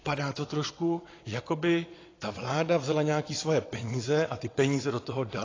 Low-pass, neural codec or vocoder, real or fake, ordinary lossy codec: 7.2 kHz; vocoder, 22.05 kHz, 80 mel bands, WaveNeXt; fake; AAC, 32 kbps